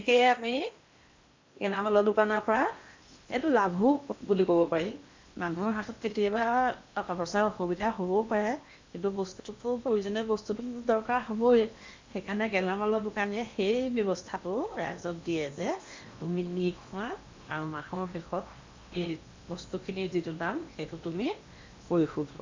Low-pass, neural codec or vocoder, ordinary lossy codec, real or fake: 7.2 kHz; codec, 16 kHz in and 24 kHz out, 0.8 kbps, FocalCodec, streaming, 65536 codes; MP3, 64 kbps; fake